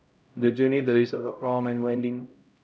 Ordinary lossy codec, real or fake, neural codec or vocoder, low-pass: none; fake; codec, 16 kHz, 0.5 kbps, X-Codec, HuBERT features, trained on LibriSpeech; none